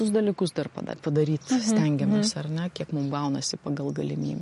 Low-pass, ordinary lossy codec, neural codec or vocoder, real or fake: 14.4 kHz; MP3, 48 kbps; none; real